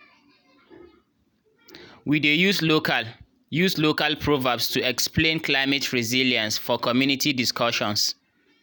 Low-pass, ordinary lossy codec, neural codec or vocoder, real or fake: none; none; none; real